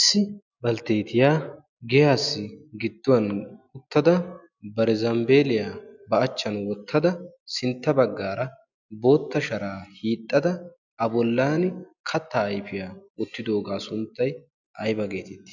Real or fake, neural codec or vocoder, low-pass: real; none; 7.2 kHz